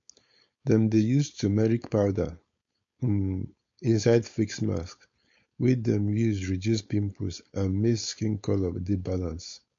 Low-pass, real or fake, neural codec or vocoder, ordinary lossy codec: 7.2 kHz; fake; codec, 16 kHz, 4.8 kbps, FACodec; MP3, 48 kbps